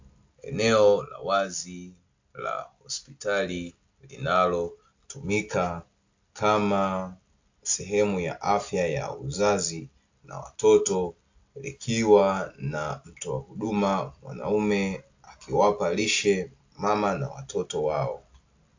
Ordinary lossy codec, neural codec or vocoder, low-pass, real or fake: AAC, 48 kbps; none; 7.2 kHz; real